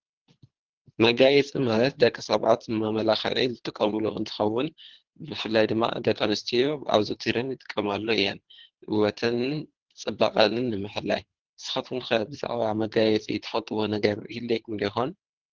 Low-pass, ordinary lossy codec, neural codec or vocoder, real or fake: 7.2 kHz; Opus, 16 kbps; codec, 24 kHz, 3 kbps, HILCodec; fake